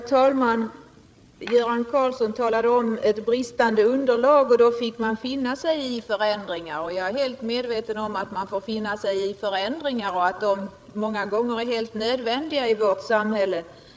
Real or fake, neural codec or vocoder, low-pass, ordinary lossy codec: fake; codec, 16 kHz, 8 kbps, FreqCodec, larger model; none; none